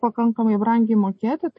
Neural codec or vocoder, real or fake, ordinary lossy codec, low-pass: none; real; MP3, 32 kbps; 10.8 kHz